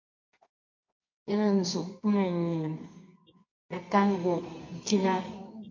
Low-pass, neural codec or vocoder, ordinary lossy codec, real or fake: 7.2 kHz; codec, 24 kHz, 0.9 kbps, WavTokenizer, medium music audio release; MP3, 48 kbps; fake